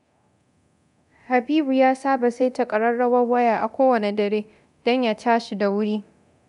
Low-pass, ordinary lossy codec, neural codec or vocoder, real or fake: 10.8 kHz; none; codec, 24 kHz, 0.9 kbps, DualCodec; fake